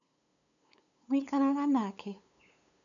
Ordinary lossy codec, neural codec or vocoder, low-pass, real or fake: none; codec, 16 kHz, 8 kbps, FunCodec, trained on LibriTTS, 25 frames a second; 7.2 kHz; fake